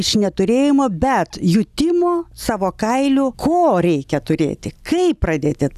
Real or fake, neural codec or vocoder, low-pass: real; none; 14.4 kHz